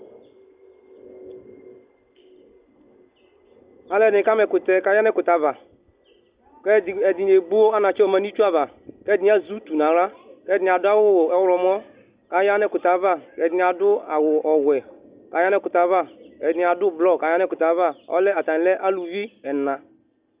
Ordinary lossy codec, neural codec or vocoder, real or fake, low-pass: Opus, 64 kbps; none; real; 3.6 kHz